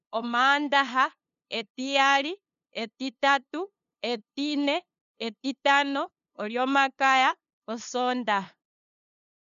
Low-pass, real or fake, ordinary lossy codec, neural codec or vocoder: 7.2 kHz; fake; none; codec, 16 kHz, 2 kbps, FunCodec, trained on LibriTTS, 25 frames a second